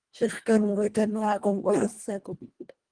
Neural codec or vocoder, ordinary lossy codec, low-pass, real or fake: codec, 24 kHz, 1.5 kbps, HILCodec; Opus, 24 kbps; 9.9 kHz; fake